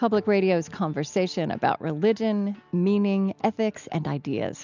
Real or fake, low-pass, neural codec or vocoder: real; 7.2 kHz; none